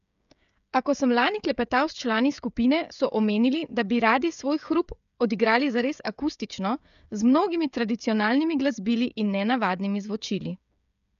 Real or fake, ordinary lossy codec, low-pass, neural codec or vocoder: fake; none; 7.2 kHz; codec, 16 kHz, 16 kbps, FreqCodec, smaller model